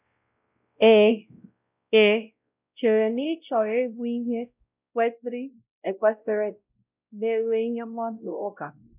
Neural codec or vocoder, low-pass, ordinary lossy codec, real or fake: codec, 16 kHz, 0.5 kbps, X-Codec, WavLM features, trained on Multilingual LibriSpeech; 3.6 kHz; none; fake